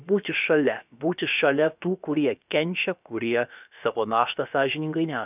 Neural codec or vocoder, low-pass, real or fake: codec, 16 kHz, about 1 kbps, DyCAST, with the encoder's durations; 3.6 kHz; fake